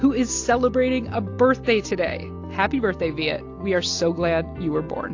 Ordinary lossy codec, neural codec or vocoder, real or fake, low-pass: AAC, 48 kbps; none; real; 7.2 kHz